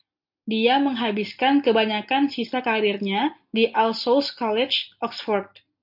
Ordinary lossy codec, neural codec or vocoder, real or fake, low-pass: MP3, 48 kbps; none; real; 5.4 kHz